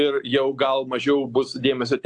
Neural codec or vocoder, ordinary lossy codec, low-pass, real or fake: none; AAC, 64 kbps; 10.8 kHz; real